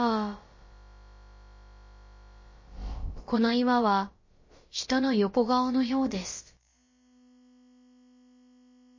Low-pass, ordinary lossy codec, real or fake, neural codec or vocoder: 7.2 kHz; MP3, 32 kbps; fake; codec, 16 kHz, about 1 kbps, DyCAST, with the encoder's durations